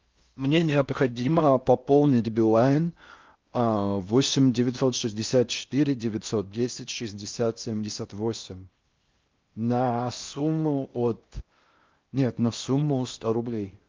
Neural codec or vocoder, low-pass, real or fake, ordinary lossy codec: codec, 16 kHz in and 24 kHz out, 0.6 kbps, FocalCodec, streaming, 4096 codes; 7.2 kHz; fake; Opus, 24 kbps